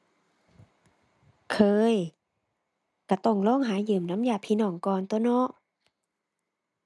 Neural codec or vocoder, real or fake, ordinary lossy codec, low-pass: none; real; none; none